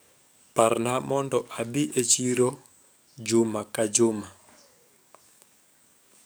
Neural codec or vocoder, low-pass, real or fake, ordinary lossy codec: codec, 44.1 kHz, 7.8 kbps, DAC; none; fake; none